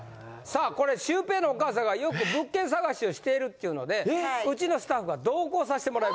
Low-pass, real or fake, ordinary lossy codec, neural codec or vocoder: none; real; none; none